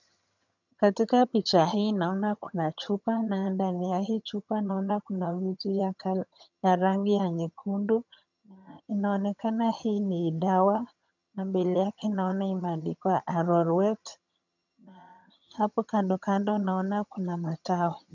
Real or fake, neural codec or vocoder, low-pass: fake; vocoder, 22.05 kHz, 80 mel bands, HiFi-GAN; 7.2 kHz